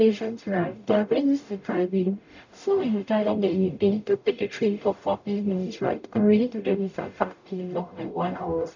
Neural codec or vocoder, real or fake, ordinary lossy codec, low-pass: codec, 44.1 kHz, 0.9 kbps, DAC; fake; none; 7.2 kHz